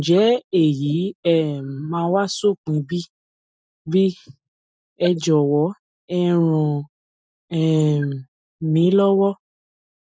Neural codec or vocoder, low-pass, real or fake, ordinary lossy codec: none; none; real; none